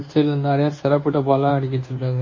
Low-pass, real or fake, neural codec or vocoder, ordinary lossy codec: 7.2 kHz; fake; codec, 24 kHz, 1.2 kbps, DualCodec; MP3, 32 kbps